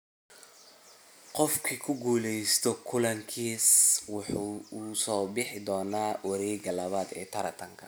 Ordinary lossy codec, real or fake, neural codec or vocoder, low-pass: none; real; none; none